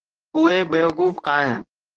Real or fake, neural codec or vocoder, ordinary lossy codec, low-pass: fake; codec, 24 kHz, 0.9 kbps, WavTokenizer, medium speech release version 2; Opus, 16 kbps; 9.9 kHz